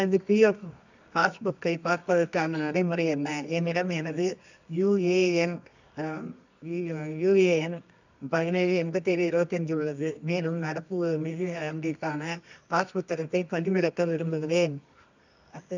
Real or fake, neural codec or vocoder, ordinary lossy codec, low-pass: fake; codec, 24 kHz, 0.9 kbps, WavTokenizer, medium music audio release; none; 7.2 kHz